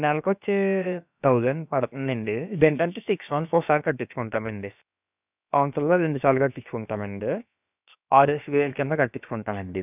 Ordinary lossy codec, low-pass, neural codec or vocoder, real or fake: none; 3.6 kHz; codec, 16 kHz, about 1 kbps, DyCAST, with the encoder's durations; fake